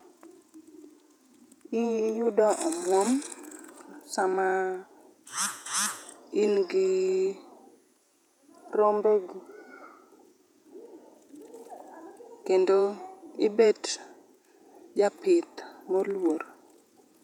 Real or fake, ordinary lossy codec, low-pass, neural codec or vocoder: fake; none; 19.8 kHz; vocoder, 48 kHz, 128 mel bands, Vocos